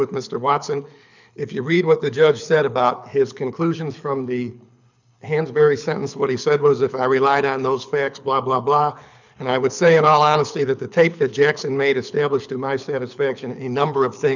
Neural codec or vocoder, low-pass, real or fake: codec, 24 kHz, 6 kbps, HILCodec; 7.2 kHz; fake